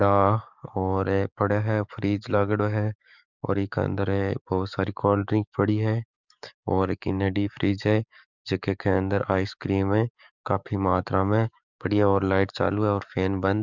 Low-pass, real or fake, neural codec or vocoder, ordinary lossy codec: 7.2 kHz; fake; codec, 44.1 kHz, 7.8 kbps, DAC; none